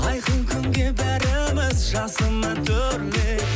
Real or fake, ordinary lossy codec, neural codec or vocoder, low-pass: real; none; none; none